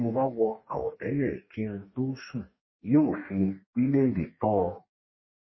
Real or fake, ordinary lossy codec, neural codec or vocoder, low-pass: fake; MP3, 24 kbps; codec, 44.1 kHz, 2.6 kbps, DAC; 7.2 kHz